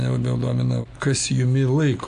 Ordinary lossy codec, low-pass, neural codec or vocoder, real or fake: AAC, 96 kbps; 9.9 kHz; none; real